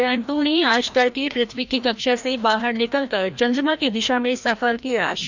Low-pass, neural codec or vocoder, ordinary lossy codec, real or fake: 7.2 kHz; codec, 16 kHz, 1 kbps, FreqCodec, larger model; none; fake